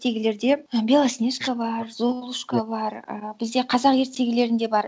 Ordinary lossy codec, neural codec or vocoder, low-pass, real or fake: none; none; none; real